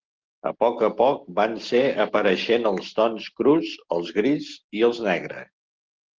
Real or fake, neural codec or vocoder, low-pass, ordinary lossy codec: real; none; 7.2 kHz; Opus, 16 kbps